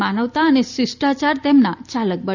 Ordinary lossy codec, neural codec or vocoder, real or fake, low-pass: none; none; real; 7.2 kHz